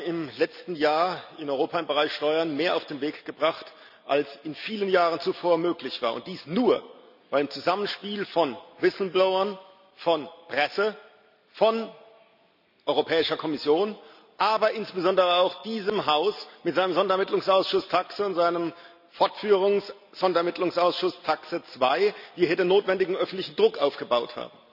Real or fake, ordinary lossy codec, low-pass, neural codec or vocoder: real; none; 5.4 kHz; none